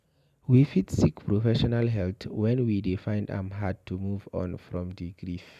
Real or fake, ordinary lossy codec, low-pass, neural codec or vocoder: real; none; 14.4 kHz; none